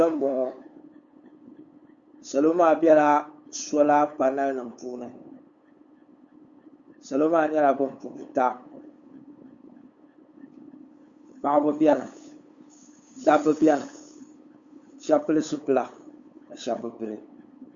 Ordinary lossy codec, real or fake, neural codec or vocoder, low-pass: Opus, 64 kbps; fake; codec, 16 kHz, 8 kbps, FunCodec, trained on LibriTTS, 25 frames a second; 7.2 kHz